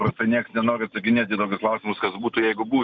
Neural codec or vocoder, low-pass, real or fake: none; 7.2 kHz; real